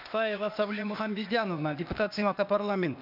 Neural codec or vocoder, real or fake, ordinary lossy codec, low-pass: codec, 16 kHz, 0.8 kbps, ZipCodec; fake; none; 5.4 kHz